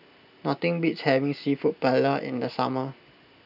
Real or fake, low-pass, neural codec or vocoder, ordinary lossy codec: real; 5.4 kHz; none; none